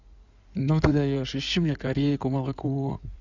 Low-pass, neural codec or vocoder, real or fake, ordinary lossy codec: 7.2 kHz; codec, 16 kHz in and 24 kHz out, 2.2 kbps, FireRedTTS-2 codec; fake; none